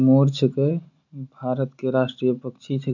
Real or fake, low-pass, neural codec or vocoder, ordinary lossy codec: real; 7.2 kHz; none; none